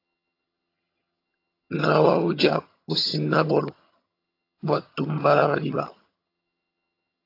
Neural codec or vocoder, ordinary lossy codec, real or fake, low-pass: vocoder, 22.05 kHz, 80 mel bands, HiFi-GAN; AAC, 32 kbps; fake; 5.4 kHz